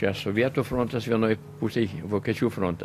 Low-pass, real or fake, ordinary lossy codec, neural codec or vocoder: 14.4 kHz; real; AAC, 64 kbps; none